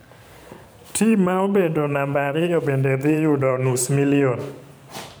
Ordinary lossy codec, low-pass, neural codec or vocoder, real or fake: none; none; vocoder, 44.1 kHz, 128 mel bands every 512 samples, BigVGAN v2; fake